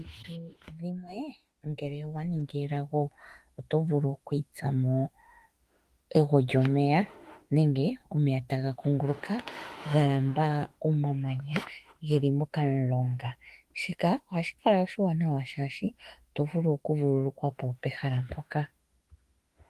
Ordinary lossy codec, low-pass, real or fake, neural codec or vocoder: Opus, 24 kbps; 14.4 kHz; fake; autoencoder, 48 kHz, 32 numbers a frame, DAC-VAE, trained on Japanese speech